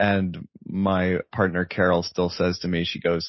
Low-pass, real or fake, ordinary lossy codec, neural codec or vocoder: 7.2 kHz; real; MP3, 24 kbps; none